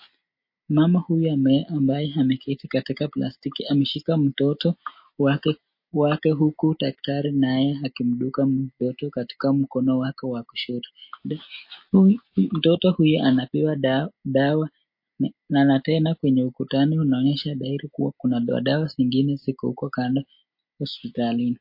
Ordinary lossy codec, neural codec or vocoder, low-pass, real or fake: MP3, 32 kbps; none; 5.4 kHz; real